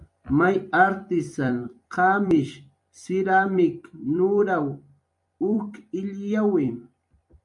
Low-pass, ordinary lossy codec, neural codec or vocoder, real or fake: 10.8 kHz; MP3, 64 kbps; vocoder, 44.1 kHz, 128 mel bands every 256 samples, BigVGAN v2; fake